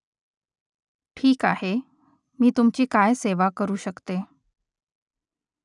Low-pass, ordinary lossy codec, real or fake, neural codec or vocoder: 10.8 kHz; none; real; none